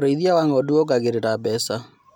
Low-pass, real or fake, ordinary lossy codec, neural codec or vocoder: 19.8 kHz; real; none; none